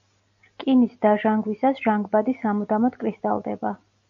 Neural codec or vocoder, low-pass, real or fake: none; 7.2 kHz; real